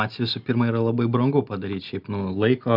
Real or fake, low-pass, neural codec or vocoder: real; 5.4 kHz; none